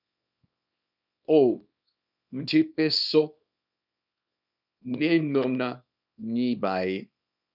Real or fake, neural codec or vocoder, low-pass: fake; codec, 24 kHz, 0.9 kbps, WavTokenizer, small release; 5.4 kHz